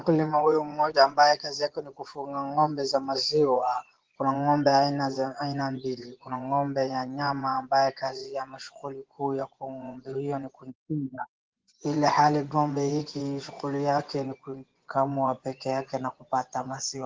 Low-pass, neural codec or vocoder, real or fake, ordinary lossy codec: 7.2 kHz; vocoder, 24 kHz, 100 mel bands, Vocos; fake; Opus, 16 kbps